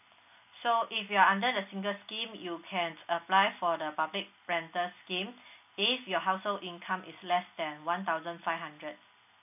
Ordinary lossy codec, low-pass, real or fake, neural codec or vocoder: none; 3.6 kHz; real; none